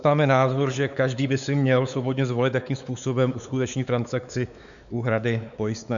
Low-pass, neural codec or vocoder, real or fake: 7.2 kHz; codec, 16 kHz, 4 kbps, X-Codec, WavLM features, trained on Multilingual LibriSpeech; fake